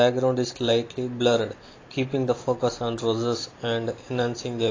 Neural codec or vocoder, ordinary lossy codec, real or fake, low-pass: none; AAC, 32 kbps; real; 7.2 kHz